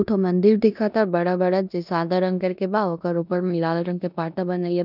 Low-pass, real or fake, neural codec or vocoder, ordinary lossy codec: 5.4 kHz; fake; codec, 16 kHz in and 24 kHz out, 0.9 kbps, LongCat-Audio-Codec, four codebook decoder; none